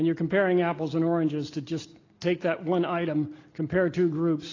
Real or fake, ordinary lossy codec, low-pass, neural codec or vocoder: real; AAC, 32 kbps; 7.2 kHz; none